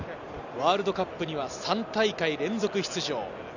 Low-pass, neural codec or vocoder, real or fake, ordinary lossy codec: 7.2 kHz; none; real; none